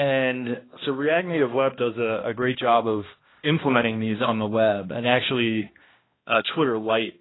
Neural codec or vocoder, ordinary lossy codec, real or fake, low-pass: codec, 16 kHz, 1 kbps, X-Codec, HuBERT features, trained on balanced general audio; AAC, 16 kbps; fake; 7.2 kHz